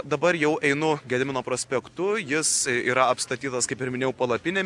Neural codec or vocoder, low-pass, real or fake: none; 10.8 kHz; real